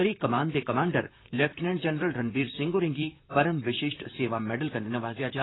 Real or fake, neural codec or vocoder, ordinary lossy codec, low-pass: fake; vocoder, 44.1 kHz, 128 mel bands, Pupu-Vocoder; AAC, 16 kbps; 7.2 kHz